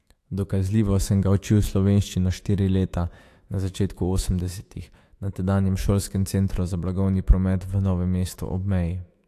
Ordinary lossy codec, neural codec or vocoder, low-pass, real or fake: AAC, 64 kbps; autoencoder, 48 kHz, 128 numbers a frame, DAC-VAE, trained on Japanese speech; 14.4 kHz; fake